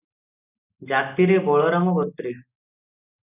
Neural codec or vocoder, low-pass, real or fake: none; 3.6 kHz; real